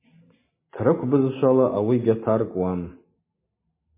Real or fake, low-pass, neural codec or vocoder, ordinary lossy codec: real; 3.6 kHz; none; MP3, 16 kbps